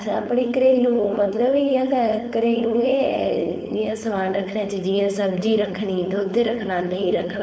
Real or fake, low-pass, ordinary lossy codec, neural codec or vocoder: fake; none; none; codec, 16 kHz, 4.8 kbps, FACodec